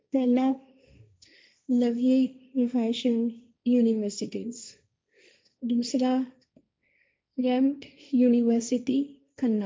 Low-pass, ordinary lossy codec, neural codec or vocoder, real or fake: none; none; codec, 16 kHz, 1.1 kbps, Voila-Tokenizer; fake